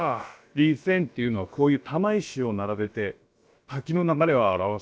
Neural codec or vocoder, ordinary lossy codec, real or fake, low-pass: codec, 16 kHz, about 1 kbps, DyCAST, with the encoder's durations; none; fake; none